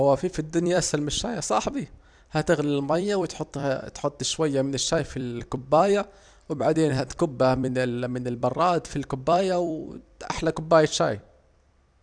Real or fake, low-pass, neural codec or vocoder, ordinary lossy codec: fake; 9.9 kHz; vocoder, 44.1 kHz, 128 mel bands every 256 samples, BigVGAN v2; none